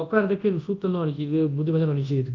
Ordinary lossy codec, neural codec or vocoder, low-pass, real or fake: Opus, 24 kbps; codec, 24 kHz, 0.9 kbps, WavTokenizer, large speech release; 7.2 kHz; fake